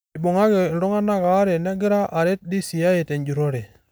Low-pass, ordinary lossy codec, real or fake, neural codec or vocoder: none; none; real; none